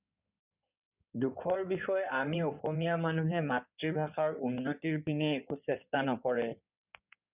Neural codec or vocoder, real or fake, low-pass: codec, 16 kHz in and 24 kHz out, 2.2 kbps, FireRedTTS-2 codec; fake; 3.6 kHz